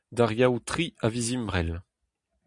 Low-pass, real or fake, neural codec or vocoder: 10.8 kHz; real; none